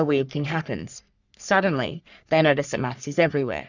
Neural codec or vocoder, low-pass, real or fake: codec, 44.1 kHz, 3.4 kbps, Pupu-Codec; 7.2 kHz; fake